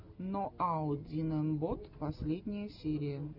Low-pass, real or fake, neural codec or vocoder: 5.4 kHz; real; none